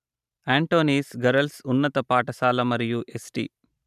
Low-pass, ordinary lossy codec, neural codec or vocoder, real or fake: 14.4 kHz; none; vocoder, 44.1 kHz, 128 mel bands every 512 samples, BigVGAN v2; fake